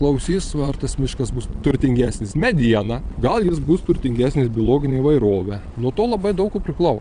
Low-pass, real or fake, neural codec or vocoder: 9.9 kHz; fake; vocoder, 22.05 kHz, 80 mel bands, Vocos